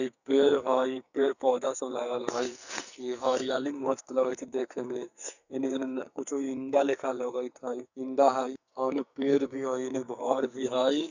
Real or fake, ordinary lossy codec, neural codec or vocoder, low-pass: fake; none; codec, 44.1 kHz, 2.6 kbps, SNAC; 7.2 kHz